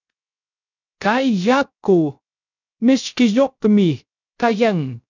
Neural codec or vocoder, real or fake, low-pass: codec, 16 kHz, 0.3 kbps, FocalCodec; fake; 7.2 kHz